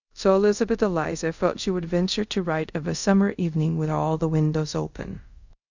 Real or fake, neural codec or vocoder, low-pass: fake; codec, 24 kHz, 0.5 kbps, DualCodec; 7.2 kHz